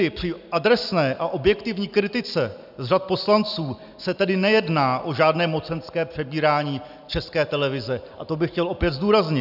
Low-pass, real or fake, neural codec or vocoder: 5.4 kHz; real; none